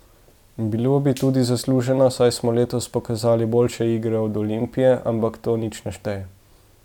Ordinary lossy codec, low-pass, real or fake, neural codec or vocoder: none; 19.8 kHz; real; none